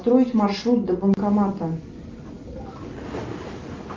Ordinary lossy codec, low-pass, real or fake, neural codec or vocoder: Opus, 32 kbps; 7.2 kHz; real; none